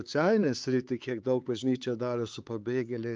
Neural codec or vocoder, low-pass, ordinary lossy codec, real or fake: codec, 16 kHz, 4 kbps, X-Codec, HuBERT features, trained on LibriSpeech; 7.2 kHz; Opus, 24 kbps; fake